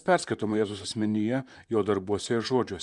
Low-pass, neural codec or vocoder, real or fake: 10.8 kHz; vocoder, 44.1 kHz, 128 mel bands, Pupu-Vocoder; fake